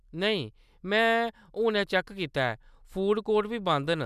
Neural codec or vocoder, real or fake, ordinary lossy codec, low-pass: none; real; none; 14.4 kHz